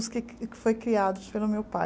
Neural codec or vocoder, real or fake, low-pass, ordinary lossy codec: none; real; none; none